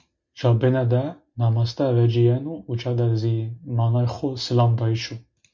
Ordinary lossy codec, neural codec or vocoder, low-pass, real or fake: MP3, 64 kbps; none; 7.2 kHz; real